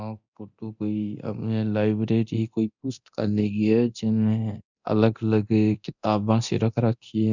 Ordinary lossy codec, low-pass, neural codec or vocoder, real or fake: none; 7.2 kHz; codec, 24 kHz, 0.9 kbps, DualCodec; fake